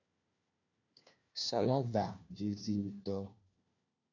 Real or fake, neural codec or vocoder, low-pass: fake; codec, 16 kHz, 1 kbps, FunCodec, trained on LibriTTS, 50 frames a second; 7.2 kHz